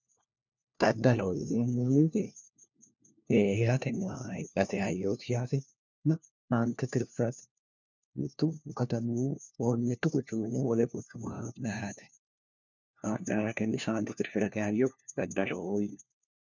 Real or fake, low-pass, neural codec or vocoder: fake; 7.2 kHz; codec, 16 kHz, 1 kbps, FunCodec, trained on LibriTTS, 50 frames a second